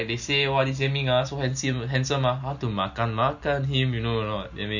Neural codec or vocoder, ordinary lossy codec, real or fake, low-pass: none; none; real; 7.2 kHz